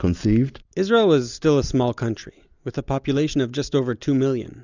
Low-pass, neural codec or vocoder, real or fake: 7.2 kHz; none; real